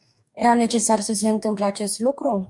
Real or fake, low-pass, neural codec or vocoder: fake; 10.8 kHz; codec, 44.1 kHz, 2.6 kbps, DAC